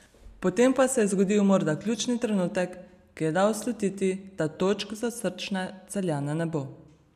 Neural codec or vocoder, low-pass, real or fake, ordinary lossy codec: none; 14.4 kHz; real; none